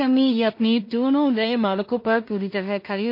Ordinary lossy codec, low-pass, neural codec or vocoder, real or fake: MP3, 32 kbps; 5.4 kHz; codec, 16 kHz in and 24 kHz out, 0.4 kbps, LongCat-Audio-Codec, two codebook decoder; fake